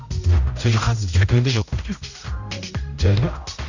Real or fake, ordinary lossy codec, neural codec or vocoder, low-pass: fake; none; codec, 16 kHz, 0.5 kbps, X-Codec, HuBERT features, trained on general audio; 7.2 kHz